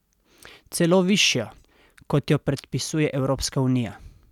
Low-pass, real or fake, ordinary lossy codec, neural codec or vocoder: 19.8 kHz; real; none; none